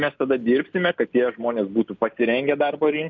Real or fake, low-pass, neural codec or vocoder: real; 7.2 kHz; none